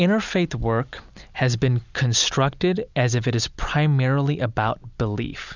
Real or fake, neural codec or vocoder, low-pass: real; none; 7.2 kHz